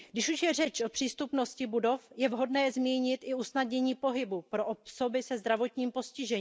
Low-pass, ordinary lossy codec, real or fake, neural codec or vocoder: none; none; real; none